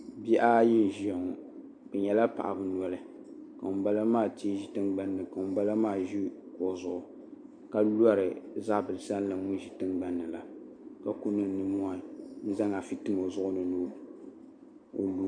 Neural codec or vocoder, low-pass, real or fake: none; 9.9 kHz; real